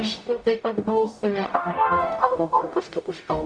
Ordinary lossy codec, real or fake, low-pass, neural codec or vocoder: Opus, 64 kbps; fake; 9.9 kHz; codec, 44.1 kHz, 0.9 kbps, DAC